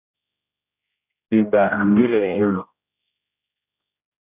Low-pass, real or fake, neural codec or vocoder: 3.6 kHz; fake; codec, 16 kHz, 0.5 kbps, X-Codec, HuBERT features, trained on general audio